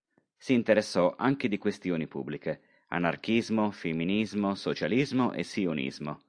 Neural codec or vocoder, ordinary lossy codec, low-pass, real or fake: none; AAC, 64 kbps; 9.9 kHz; real